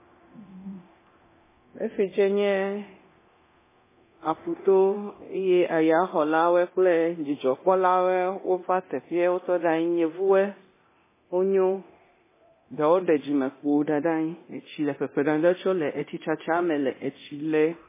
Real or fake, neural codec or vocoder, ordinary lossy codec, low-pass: fake; codec, 24 kHz, 0.9 kbps, DualCodec; MP3, 16 kbps; 3.6 kHz